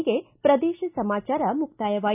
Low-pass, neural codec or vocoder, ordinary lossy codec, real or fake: 3.6 kHz; none; none; real